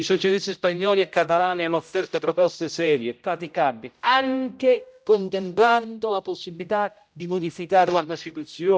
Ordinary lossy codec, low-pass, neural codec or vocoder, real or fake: none; none; codec, 16 kHz, 0.5 kbps, X-Codec, HuBERT features, trained on general audio; fake